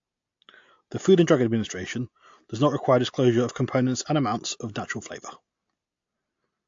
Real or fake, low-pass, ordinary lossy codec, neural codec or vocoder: real; 7.2 kHz; AAC, 48 kbps; none